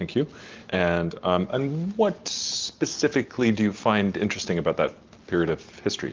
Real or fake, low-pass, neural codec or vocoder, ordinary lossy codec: real; 7.2 kHz; none; Opus, 24 kbps